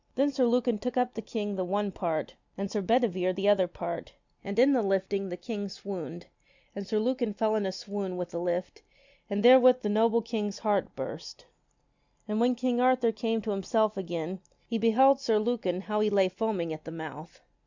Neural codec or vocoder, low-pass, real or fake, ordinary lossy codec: none; 7.2 kHz; real; Opus, 64 kbps